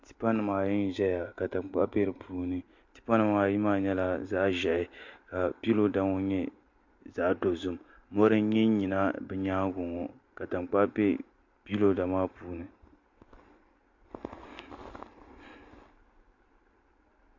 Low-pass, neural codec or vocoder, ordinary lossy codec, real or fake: 7.2 kHz; none; MP3, 48 kbps; real